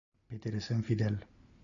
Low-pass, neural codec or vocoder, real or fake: 7.2 kHz; none; real